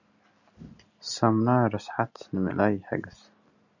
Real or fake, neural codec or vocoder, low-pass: real; none; 7.2 kHz